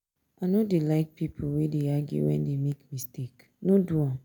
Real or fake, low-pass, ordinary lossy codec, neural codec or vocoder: real; none; none; none